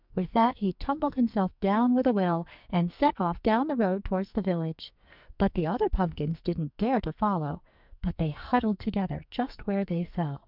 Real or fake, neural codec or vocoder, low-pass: fake; codec, 44.1 kHz, 2.6 kbps, SNAC; 5.4 kHz